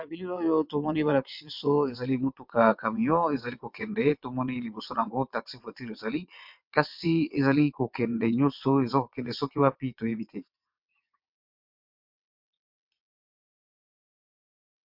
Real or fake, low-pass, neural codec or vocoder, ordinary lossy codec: fake; 5.4 kHz; vocoder, 22.05 kHz, 80 mel bands, WaveNeXt; MP3, 48 kbps